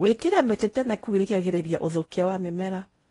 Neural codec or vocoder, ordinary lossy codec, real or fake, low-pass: codec, 16 kHz in and 24 kHz out, 0.8 kbps, FocalCodec, streaming, 65536 codes; AAC, 32 kbps; fake; 10.8 kHz